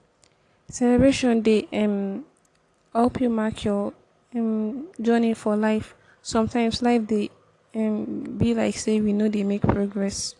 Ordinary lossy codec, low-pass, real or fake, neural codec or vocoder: AAC, 48 kbps; 10.8 kHz; real; none